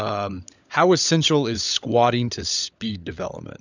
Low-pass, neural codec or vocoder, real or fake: 7.2 kHz; codec, 16 kHz, 16 kbps, FunCodec, trained on LibriTTS, 50 frames a second; fake